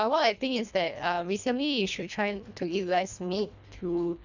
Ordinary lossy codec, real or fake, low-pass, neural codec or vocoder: none; fake; 7.2 kHz; codec, 24 kHz, 1.5 kbps, HILCodec